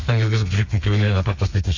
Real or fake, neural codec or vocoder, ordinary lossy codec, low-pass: fake; codec, 32 kHz, 1.9 kbps, SNAC; AAC, 48 kbps; 7.2 kHz